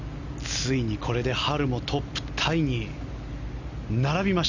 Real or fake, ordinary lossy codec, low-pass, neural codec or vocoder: real; none; 7.2 kHz; none